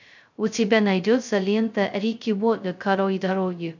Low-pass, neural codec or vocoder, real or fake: 7.2 kHz; codec, 16 kHz, 0.2 kbps, FocalCodec; fake